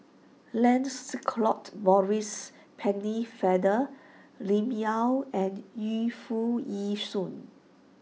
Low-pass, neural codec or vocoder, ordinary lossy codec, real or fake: none; none; none; real